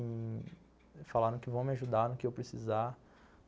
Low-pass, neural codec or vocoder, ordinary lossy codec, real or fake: none; none; none; real